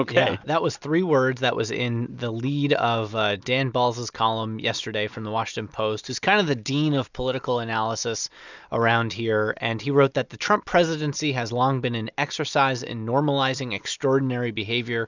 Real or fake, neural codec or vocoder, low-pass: real; none; 7.2 kHz